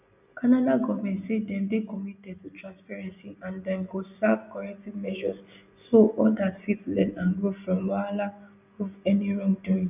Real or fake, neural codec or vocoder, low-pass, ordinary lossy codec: real; none; 3.6 kHz; none